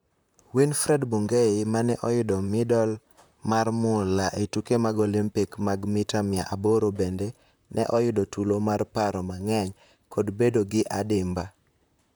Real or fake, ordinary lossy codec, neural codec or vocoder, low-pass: fake; none; vocoder, 44.1 kHz, 128 mel bands, Pupu-Vocoder; none